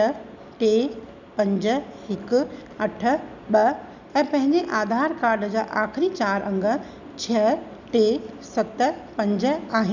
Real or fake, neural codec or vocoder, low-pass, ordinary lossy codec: real; none; 7.2 kHz; none